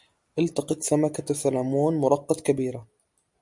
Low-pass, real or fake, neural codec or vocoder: 10.8 kHz; real; none